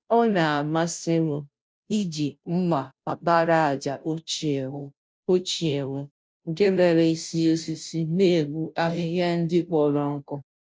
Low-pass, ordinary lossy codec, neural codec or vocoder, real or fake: none; none; codec, 16 kHz, 0.5 kbps, FunCodec, trained on Chinese and English, 25 frames a second; fake